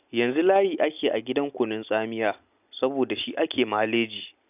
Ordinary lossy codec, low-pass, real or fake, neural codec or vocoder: none; 3.6 kHz; real; none